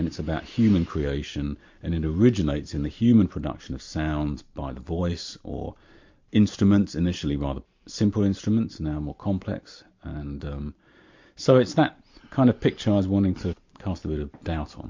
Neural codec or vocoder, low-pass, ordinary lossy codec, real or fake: vocoder, 44.1 kHz, 128 mel bands every 512 samples, BigVGAN v2; 7.2 kHz; MP3, 48 kbps; fake